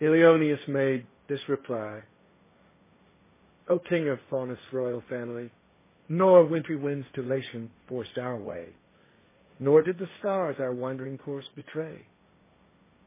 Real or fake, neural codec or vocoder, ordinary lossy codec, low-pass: fake; codec, 16 kHz, 1.1 kbps, Voila-Tokenizer; MP3, 16 kbps; 3.6 kHz